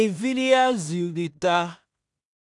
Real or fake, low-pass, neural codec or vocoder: fake; 10.8 kHz; codec, 16 kHz in and 24 kHz out, 0.4 kbps, LongCat-Audio-Codec, two codebook decoder